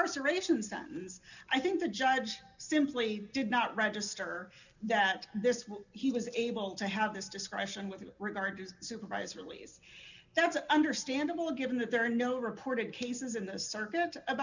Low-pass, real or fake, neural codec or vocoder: 7.2 kHz; real; none